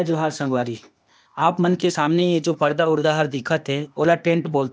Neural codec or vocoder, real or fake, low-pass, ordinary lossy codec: codec, 16 kHz, 0.8 kbps, ZipCodec; fake; none; none